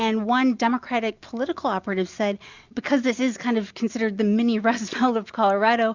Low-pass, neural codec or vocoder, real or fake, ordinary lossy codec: 7.2 kHz; none; real; Opus, 64 kbps